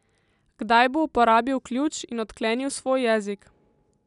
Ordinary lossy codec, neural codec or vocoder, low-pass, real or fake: none; none; 10.8 kHz; real